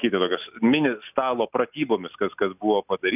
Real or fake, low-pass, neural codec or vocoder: real; 3.6 kHz; none